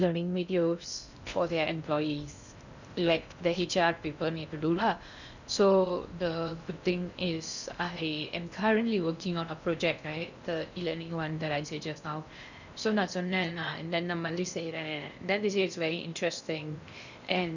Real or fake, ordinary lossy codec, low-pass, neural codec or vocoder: fake; none; 7.2 kHz; codec, 16 kHz in and 24 kHz out, 0.6 kbps, FocalCodec, streaming, 2048 codes